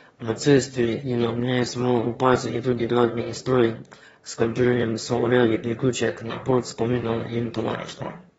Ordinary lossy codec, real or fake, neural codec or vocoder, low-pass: AAC, 24 kbps; fake; autoencoder, 22.05 kHz, a latent of 192 numbers a frame, VITS, trained on one speaker; 9.9 kHz